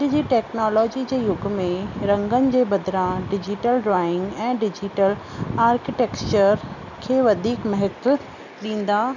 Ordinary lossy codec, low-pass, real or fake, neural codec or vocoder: none; 7.2 kHz; real; none